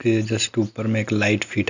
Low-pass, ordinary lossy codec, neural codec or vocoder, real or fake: 7.2 kHz; none; none; real